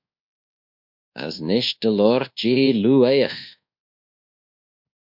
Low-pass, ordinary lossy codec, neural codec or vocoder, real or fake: 5.4 kHz; MP3, 48 kbps; codec, 24 kHz, 1.2 kbps, DualCodec; fake